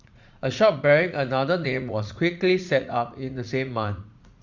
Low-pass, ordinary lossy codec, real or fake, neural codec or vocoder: 7.2 kHz; Opus, 64 kbps; fake; vocoder, 44.1 kHz, 80 mel bands, Vocos